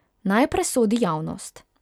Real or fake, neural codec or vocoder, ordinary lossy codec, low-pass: real; none; none; 19.8 kHz